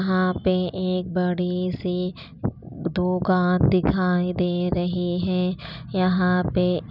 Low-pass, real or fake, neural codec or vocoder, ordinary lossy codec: 5.4 kHz; real; none; none